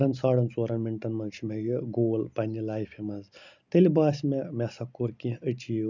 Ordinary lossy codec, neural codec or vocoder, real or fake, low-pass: none; none; real; 7.2 kHz